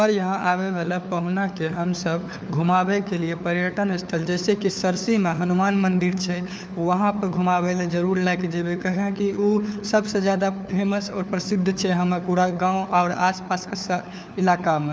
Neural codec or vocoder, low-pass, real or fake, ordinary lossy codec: codec, 16 kHz, 4 kbps, FunCodec, trained on LibriTTS, 50 frames a second; none; fake; none